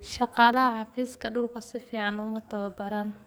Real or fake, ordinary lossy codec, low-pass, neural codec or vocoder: fake; none; none; codec, 44.1 kHz, 2.6 kbps, SNAC